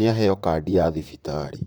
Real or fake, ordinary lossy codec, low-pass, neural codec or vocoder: fake; none; none; vocoder, 44.1 kHz, 128 mel bands, Pupu-Vocoder